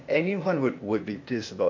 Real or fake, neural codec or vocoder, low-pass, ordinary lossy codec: fake; codec, 16 kHz, 0.8 kbps, ZipCodec; 7.2 kHz; MP3, 48 kbps